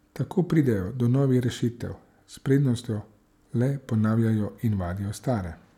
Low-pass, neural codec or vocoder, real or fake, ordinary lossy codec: 19.8 kHz; none; real; none